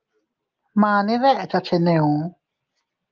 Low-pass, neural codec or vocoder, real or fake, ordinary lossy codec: 7.2 kHz; none; real; Opus, 32 kbps